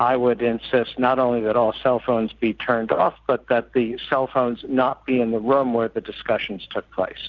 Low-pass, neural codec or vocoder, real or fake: 7.2 kHz; none; real